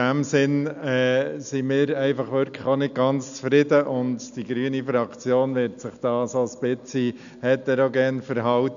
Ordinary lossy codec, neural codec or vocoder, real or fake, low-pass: none; none; real; 7.2 kHz